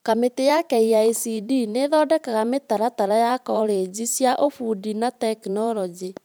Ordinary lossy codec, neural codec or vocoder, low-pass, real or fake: none; vocoder, 44.1 kHz, 128 mel bands every 512 samples, BigVGAN v2; none; fake